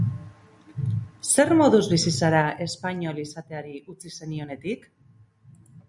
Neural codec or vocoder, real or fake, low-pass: none; real; 10.8 kHz